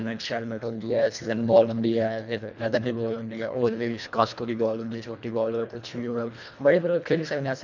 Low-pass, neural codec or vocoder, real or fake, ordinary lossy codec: 7.2 kHz; codec, 24 kHz, 1.5 kbps, HILCodec; fake; none